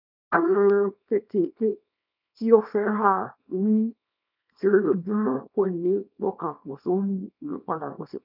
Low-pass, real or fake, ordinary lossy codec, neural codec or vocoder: 5.4 kHz; fake; none; codec, 24 kHz, 0.9 kbps, WavTokenizer, small release